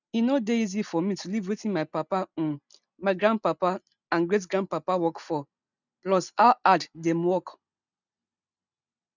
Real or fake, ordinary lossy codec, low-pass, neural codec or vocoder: real; none; 7.2 kHz; none